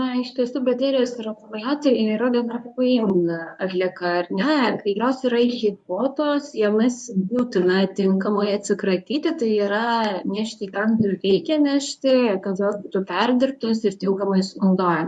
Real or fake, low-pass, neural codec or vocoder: fake; 10.8 kHz; codec, 24 kHz, 0.9 kbps, WavTokenizer, medium speech release version 1